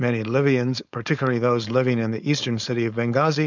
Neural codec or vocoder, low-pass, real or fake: codec, 16 kHz, 4.8 kbps, FACodec; 7.2 kHz; fake